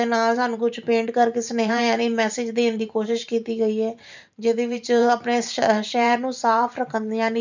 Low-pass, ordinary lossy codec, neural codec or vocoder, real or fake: 7.2 kHz; none; vocoder, 44.1 kHz, 128 mel bands every 512 samples, BigVGAN v2; fake